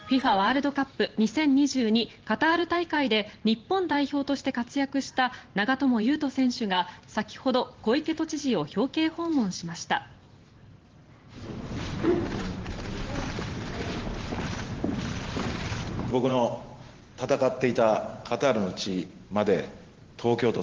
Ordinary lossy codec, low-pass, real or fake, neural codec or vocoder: Opus, 16 kbps; 7.2 kHz; fake; vocoder, 44.1 kHz, 128 mel bands every 512 samples, BigVGAN v2